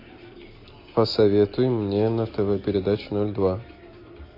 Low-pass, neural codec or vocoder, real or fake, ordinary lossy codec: 5.4 kHz; none; real; MP3, 32 kbps